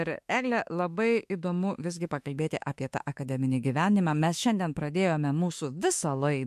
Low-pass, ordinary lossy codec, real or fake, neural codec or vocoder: 14.4 kHz; MP3, 64 kbps; fake; autoencoder, 48 kHz, 32 numbers a frame, DAC-VAE, trained on Japanese speech